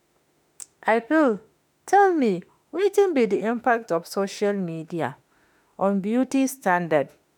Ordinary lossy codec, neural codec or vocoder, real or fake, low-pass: none; autoencoder, 48 kHz, 32 numbers a frame, DAC-VAE, trained on Japanese speech; fake; 19.8 kHz